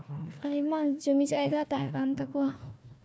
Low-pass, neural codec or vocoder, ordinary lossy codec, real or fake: none; codec, 16 kHz, 1 kbps, FunCodec, trained on Chinese and English, 50 frames a second; none; fake